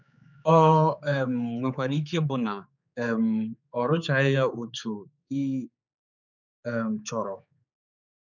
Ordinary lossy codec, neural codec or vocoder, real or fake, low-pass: none; codec, 16 kHz, 4 kbps, X-Codec, HuBERT features, trained on general audio; fake; 7.2 kHz